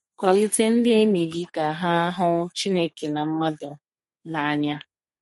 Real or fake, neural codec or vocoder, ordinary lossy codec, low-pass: fake; codec, 32 kHz, 1.9 kbps, SNAC; MP3, 48 kbps; 14.4 kHz